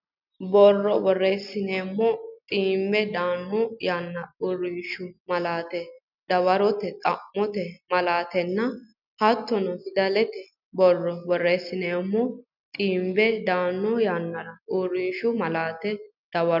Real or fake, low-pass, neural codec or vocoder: real; 5.4 kHz; none